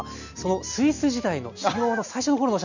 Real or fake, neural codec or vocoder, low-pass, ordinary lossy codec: real; none; 7.2 kHz; none